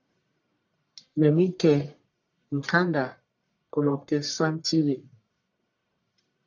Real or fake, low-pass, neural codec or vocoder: fake; 7.2 kHz; codec, 44.1 kHz, 1.7 kbps, Pupu-Codec